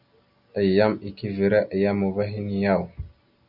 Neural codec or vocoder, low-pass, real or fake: none; 5.4 kHz; real